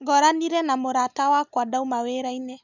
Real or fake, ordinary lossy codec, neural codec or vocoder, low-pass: real; none; none; 7.2 kHz